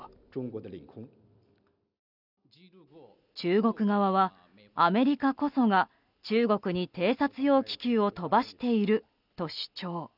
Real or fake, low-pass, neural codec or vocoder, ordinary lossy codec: real; 5.4 kHz; none; none